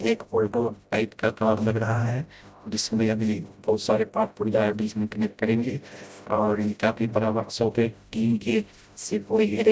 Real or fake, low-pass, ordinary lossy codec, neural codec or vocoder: fake; none; none; codec, 16 kHz, 0.5 kbps, FreqCodec, smaller model